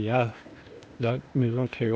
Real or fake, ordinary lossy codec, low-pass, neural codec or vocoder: fake; none; none; codec, 16 kHz, 0.8 kbps, ZipCodec